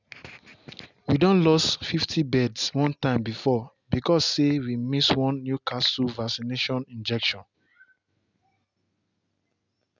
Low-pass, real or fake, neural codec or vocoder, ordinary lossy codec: 7.2 kHz; real; none; none